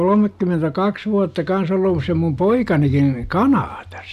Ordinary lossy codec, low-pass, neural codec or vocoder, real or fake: none; 14.4 kHz; none; real